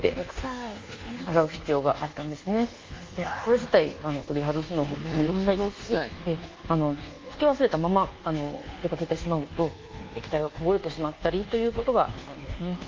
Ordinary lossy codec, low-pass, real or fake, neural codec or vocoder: Opus, 32 kbps; 7.2 kHz; fake; codec, 24 kHz, 1.2 kbps, DualCodec